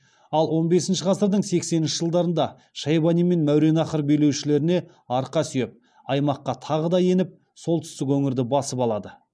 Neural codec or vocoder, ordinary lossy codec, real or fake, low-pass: none; none; real; none